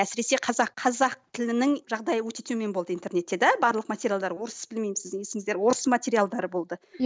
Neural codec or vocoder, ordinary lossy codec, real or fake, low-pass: none; none; real; none